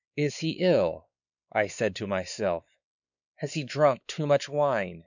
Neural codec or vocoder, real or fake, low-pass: codec, 16 kHz, 4 kbps, X-Codec, WavLM features, trained on Multilingual LibriSpeech; fake; 7.2 kHz